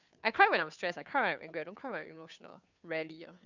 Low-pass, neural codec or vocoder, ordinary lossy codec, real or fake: 7.2 kHz; codec, 16 kHz, 2 kbps, FunCodec, trained on Chinese and English, 25 frames a second; none; fake